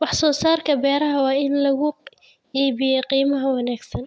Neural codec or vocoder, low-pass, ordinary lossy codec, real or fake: none; none; none; real